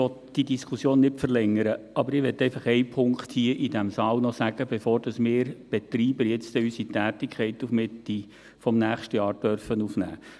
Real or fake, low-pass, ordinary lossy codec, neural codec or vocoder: real; none; none; none